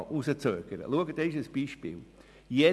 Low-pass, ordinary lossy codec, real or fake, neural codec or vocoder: none; none; real; none